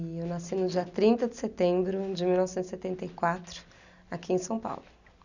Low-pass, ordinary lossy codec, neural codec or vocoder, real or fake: 7.2 kHz; none; none; real